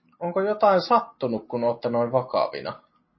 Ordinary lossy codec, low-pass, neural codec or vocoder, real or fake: MP3, 24 kbps; 7.2 kHz; none; real